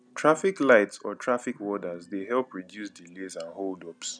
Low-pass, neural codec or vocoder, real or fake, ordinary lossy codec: 9.9 kHz; none; real; none